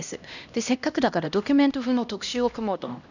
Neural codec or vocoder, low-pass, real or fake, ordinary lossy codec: codec, 16 kHz, 1 kbps, X-Codec, HuBERT features, trained on LibriSpeech; 7.2 kHz; fake; none